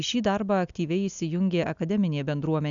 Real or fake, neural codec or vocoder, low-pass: real; none; 7.2 kHz